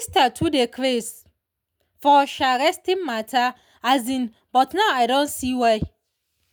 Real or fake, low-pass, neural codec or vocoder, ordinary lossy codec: real; none; none; none